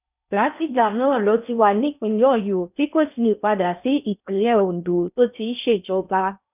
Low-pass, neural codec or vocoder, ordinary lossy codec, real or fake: 3.6 kHz; codec, 16 kHz in and 24 kHz out, 0.6 kbps, FocalCodec, streaming, 4096 codes; none; fake